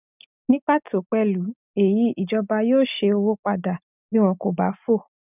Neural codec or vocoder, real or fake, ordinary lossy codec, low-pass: none; real; none; 3.6 kHz